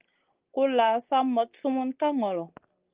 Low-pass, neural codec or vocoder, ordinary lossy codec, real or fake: 3.6 kHz; none; Opus, 32 kbps; real